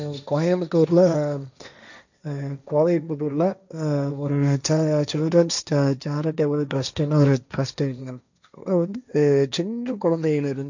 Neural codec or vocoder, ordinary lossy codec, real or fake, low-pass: codec, 16 kHz, 1.1 kbps, Voila-Tokenizer; none; fake; 7.2 kHz